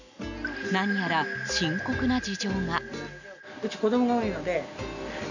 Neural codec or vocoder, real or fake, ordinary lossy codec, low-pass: none; real; none; 7.2 kHz